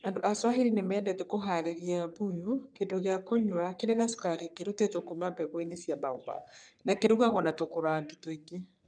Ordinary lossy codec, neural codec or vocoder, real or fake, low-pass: none; codec, 44.1 kHz, 3.4 kbps, Pupu-Codec; fake; 9.9 kHz